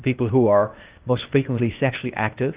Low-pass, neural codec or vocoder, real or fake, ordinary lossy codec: 3.6 kHz; codec, 16 kHz, 0.8 kbps, ZipCodec; fake; Opus, 32 kbps